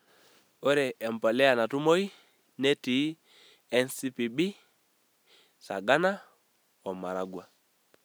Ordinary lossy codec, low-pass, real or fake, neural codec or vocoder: none; none; real; none